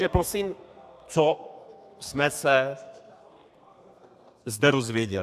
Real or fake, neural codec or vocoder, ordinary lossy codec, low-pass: fake; codec, 32 kHz, 1.9 kbps, SNAC; AAC, 96 kbps; 14.4 kHz